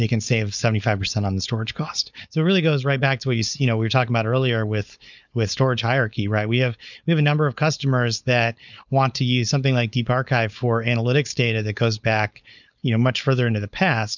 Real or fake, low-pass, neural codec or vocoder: fake; 7.2 kHz; codec, 16 kHz, 4.8 kbps, FACodec